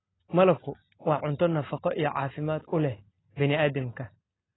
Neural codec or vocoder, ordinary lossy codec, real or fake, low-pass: none; AAC, 16 kbps; real; 7.2 kHz